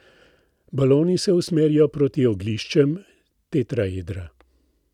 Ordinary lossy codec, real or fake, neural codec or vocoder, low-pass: none; real; none; 19.8 kHz